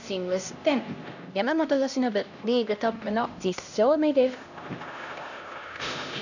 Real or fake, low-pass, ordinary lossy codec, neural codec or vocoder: fake; 7.2 kHz; none; codec, 16 kHz, 1 kbps, X-Codec, HuBERT features, trained on LibriSpeech